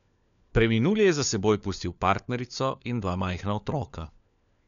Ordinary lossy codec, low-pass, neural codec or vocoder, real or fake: none; 7.2 kHz; codec, 16 kHz, 4 kbps, FunCodec, trained on LibriTTS, 50 frames a second; fake